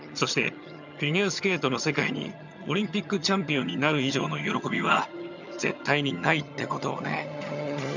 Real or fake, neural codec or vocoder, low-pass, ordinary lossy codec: fake; vocoder, 22.05 kHz, 80 mel bands, HiFi-GAN; 7.2 kHz; none